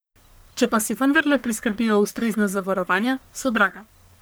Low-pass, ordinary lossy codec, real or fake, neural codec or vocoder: none; none; fake; codec, 44.1 kHz, 1.7 kbps, Pupu-Codec